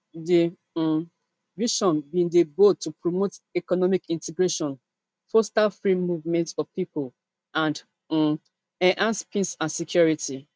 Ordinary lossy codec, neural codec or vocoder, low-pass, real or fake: none; none; none; real